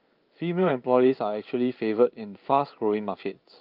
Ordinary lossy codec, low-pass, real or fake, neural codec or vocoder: Opus, 32 kbps; 5.4 kHz; fake; codec, 16 kHz in and 24 kHz out, 1 kbps, XY-Tokenizer